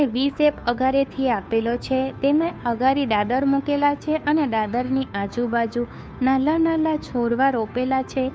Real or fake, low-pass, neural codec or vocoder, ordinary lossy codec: fake; none; codec, 16 kHz, 2 kbps, FunCodec, trained on Chinese and English, 25 frames a second; none